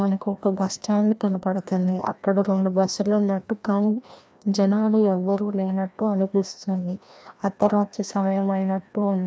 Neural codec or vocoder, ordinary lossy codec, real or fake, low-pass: codec, 16 kHz, 1 kbps, FreqCodec, larger model; none; fake; none